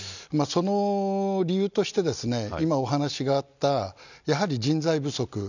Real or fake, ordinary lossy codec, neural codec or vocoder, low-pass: real; none; none; 7.2 kHz